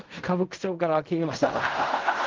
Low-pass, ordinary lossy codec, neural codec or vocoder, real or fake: 7.2 kHz; Opus, 32 kbps; codec, 16 kHz in and 24 kHz out, 0.4 kbps, LongCat-Audio-Codec, fine tuned four codebook decoder; fake